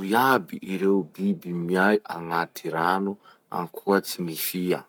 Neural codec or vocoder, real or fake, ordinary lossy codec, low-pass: codec, 44.1 kHz, 7.8 kbps, Pupu-Codec; fake; none; none